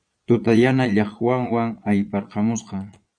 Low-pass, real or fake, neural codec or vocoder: 9.9 kHz; fake; vocoder, 22.05 kHz, 80 mel bands, Vocos